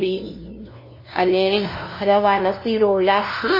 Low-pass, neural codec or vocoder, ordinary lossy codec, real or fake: 5.4 kHz; codec, 16 kHz, 0.5 kbps, FunCodec, trained on LibriTTS, 25 frames a second; MP3, 24 kbps; fake